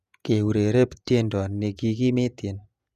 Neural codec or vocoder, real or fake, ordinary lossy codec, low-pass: vocoder, 48 kHz, 128 mel bands, Vocos; fake; none; 14.4 kHz